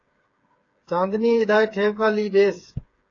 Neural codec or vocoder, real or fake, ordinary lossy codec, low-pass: codec, 16 kHz, 8 kbps, FreqCodec, smaller model; fake; AAC, 32 kbps; 7.2 kHz